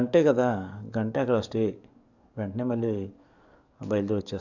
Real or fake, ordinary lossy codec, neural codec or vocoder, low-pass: fake; none; codec, 44.1 kHz, 7.8 kbps, DAC; 7.2 kHz